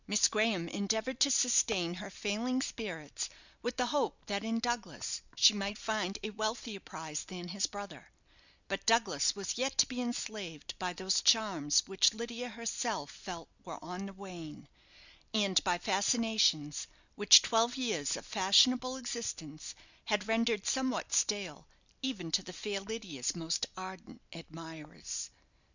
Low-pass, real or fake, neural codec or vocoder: 7.2 kHz; real; none